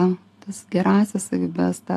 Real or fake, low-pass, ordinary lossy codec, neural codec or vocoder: real; 14.4 kHz; AAC, 64 kbps; none